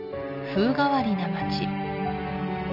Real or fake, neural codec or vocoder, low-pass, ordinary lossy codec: real; none; 5.4 kHz; none